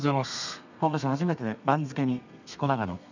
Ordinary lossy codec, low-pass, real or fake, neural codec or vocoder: none; 7.2 kHz; fake; codec, 32 kHz, 1.9 kbps, SNAC